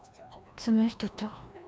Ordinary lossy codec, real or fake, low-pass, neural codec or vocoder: none; fake; none; codec, 16 kHz, 1 kbps, FreqCodec, larger model